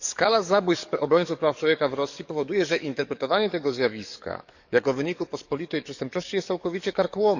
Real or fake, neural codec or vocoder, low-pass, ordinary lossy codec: fake; codec, 44.1 kHz, 7.8 kbps, DAC; 7.2 kHz; none